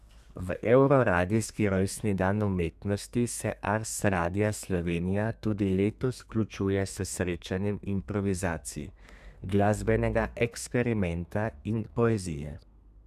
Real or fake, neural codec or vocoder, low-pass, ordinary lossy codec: fake; codec, 32 kHz, 1.9 kbps, SNAC; 14.4 kHz; none